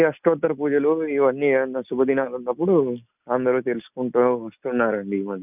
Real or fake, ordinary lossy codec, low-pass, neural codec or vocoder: real; none; 3.6 kHz; none